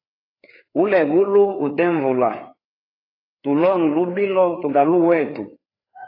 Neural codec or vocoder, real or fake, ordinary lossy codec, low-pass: codec, 16 kHz, 4 kbps, FreqCodec, larger model; fake; AAC, 24 kbps; 5.4 kHz